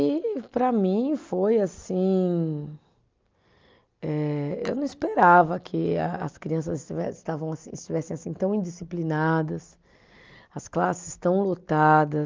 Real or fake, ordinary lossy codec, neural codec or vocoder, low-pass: real; Opus, 24 kbps; none; 7.2 kHz